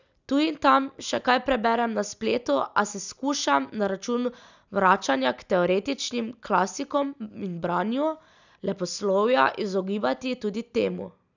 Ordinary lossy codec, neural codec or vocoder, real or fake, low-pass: none; none; real; 7.2 kHz